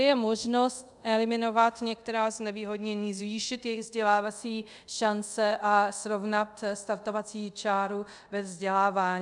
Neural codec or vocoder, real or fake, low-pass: codec, 24 kHz, 0.5 kbps, DualCodec; fake; 10.8 kHz